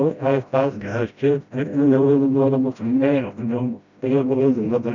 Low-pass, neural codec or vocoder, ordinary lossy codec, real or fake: 7.2 kHz; codec, 16 kHz, 0.5 kbps, FreqCodec, smaller model; none; fake